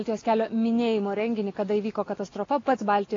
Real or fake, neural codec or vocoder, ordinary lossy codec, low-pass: real; none; AAC, 32 kbps; 7.2 kHz